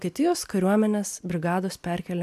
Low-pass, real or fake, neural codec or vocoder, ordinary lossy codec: 14.4 kHz; real; none; AAC, 96 kbps